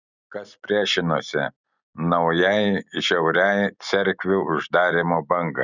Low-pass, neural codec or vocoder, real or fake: 7.2 kHz; none; real